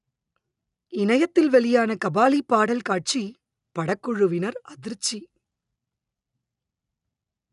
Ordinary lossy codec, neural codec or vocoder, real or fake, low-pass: none; none; real; 10.8 kHz